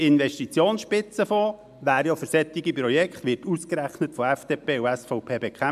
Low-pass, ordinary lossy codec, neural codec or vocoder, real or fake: 14.4 kHz; MP3, 96 kbps; none; real